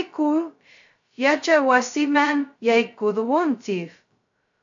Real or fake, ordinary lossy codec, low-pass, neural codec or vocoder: fake; AAC, 64 kbps; 7.2 kHz; codec, 16 kHz, 0.2 kbps, FocalCodec